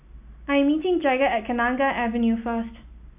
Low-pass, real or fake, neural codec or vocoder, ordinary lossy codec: 3.6 kHz; real; none; none